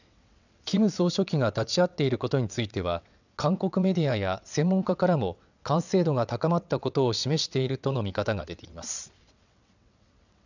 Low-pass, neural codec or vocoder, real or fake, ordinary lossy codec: 7.2 kHz; vocoder, 22.05 kHz, 80 mel bands, WaveNeXt; fake; none